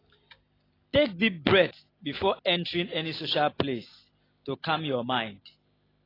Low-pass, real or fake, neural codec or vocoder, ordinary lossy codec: 5.4 kHz; real; none; AAC, 24 kbps